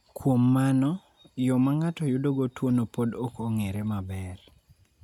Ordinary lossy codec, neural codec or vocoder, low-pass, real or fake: none; none; 19.8 kHz; real